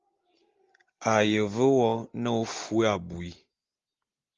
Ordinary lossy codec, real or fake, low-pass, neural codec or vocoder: Opus, 32 kbps; real; 7.2 kHz; none